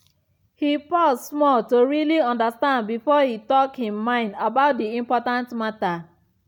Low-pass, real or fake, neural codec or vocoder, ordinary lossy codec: 19.8 kHz; real; none; none